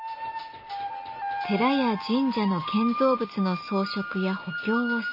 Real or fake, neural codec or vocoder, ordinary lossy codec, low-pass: real; none; MP3, 24 kbps; 5.4 kHz